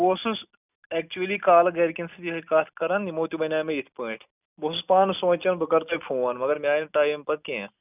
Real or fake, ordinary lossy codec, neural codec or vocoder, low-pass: real; none; none; 3.6 kHz